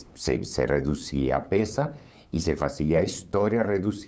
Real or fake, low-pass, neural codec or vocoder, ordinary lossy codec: fake; none; codec, 16 kHz, 8 kbps, FunCodec, trained on LibriTTS, 25 frames a second; none